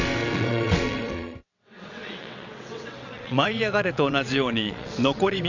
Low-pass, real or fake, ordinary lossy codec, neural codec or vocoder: 7.2 kHz; fake; none; vocoder, 22.05 kHz, 80 mel bands, WaveNeXt